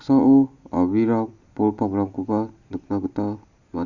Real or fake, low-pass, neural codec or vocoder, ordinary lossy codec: real; 7.2 kHz; none; Opus, 64 kbps